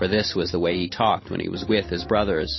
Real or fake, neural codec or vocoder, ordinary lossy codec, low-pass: real; none; MP3, 24 kbps; 7.2 kHz